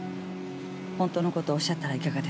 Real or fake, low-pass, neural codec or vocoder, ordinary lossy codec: real; none; none; none